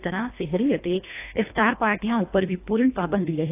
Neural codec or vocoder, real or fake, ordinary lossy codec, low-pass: codec, 24 kHz, 1.5 kbps, HILCodec; fake; AAC, 24 kbps; 3.6 kHz